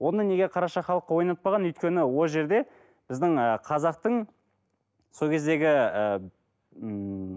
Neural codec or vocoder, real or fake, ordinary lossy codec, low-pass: none; real; none; none